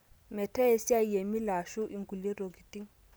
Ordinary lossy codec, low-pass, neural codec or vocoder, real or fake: none; none; none; real